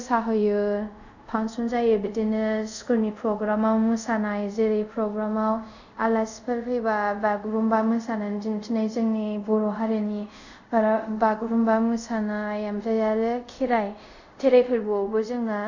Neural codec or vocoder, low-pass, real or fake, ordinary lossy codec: codec, 24 kHz, 0.5 kbps, DualCodec; 7.2 kHz; fake; none